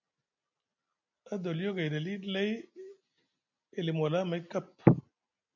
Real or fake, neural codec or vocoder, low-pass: real; none; 7.2 kHz